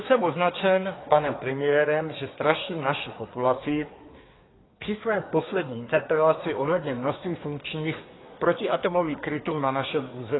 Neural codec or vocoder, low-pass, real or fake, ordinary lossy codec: codec, 24 kHz, 1 kbps, SNAC; 7.2 kHz; fake; AAC, 16 kbps